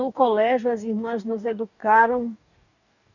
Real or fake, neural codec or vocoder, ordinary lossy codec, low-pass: fake; codec, 44.1 kHz, 2.6 kbps, DAC; none; 7.2 kHz